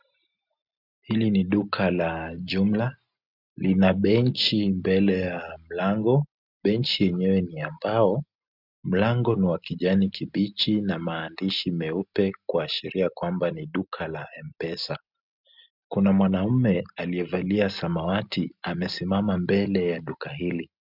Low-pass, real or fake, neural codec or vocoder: 5.4 kHz; real; none